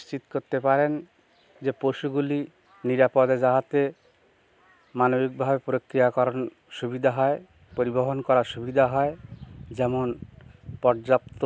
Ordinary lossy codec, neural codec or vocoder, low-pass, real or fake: none; none; none; real